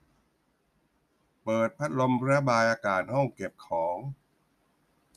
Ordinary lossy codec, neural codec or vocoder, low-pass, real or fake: none; none; 14.4 kHz; real